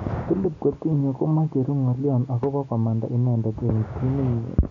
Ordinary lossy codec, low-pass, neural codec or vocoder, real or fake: none; 7.2 kHz; none; real